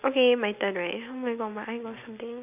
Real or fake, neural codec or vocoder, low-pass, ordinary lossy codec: real; none; 3.6 kHz; none